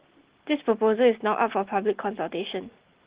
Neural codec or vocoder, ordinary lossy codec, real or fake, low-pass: none; Opus, 16 kbps; real; 3.6 kHz